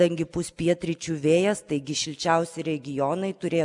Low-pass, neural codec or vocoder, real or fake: 10.8 kHz; none; real